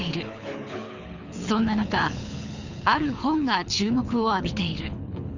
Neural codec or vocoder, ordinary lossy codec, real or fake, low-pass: codec, 24 kHz, 6 kbps, HILCodec; Opus, 64 kbps; fake; 7.2 kHz